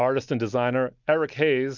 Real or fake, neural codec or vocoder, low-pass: real; none; 7.2 kHz